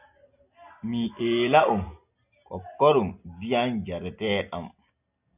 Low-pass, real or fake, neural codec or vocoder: 3.6 kHz; real; none